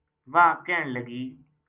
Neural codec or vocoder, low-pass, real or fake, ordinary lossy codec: none; 3.6 kHz; real; Opus, 24 kbps